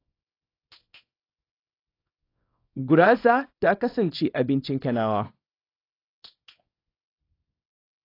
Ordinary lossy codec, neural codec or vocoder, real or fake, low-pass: AAC, 32 kbps; codec, 24 kHz, 0.9 kbps, WavTokenizer, small release; fake; 5.4 kHz